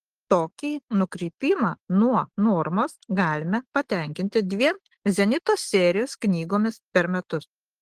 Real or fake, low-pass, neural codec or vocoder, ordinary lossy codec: real; 14.4 kHz; none; Opus, 16 kbps